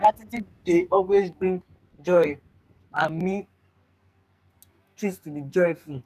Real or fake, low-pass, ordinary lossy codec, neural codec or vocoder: fake; 14.4 kHz; none; codec, 44.1 kHz, 2.6 kbps, SNAC